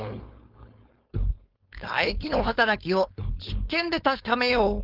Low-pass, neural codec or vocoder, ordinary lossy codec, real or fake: 5.4 kHz; codec, 16 kHz, 4.8 kbps, FACodec; Opus, 24 kbps; fake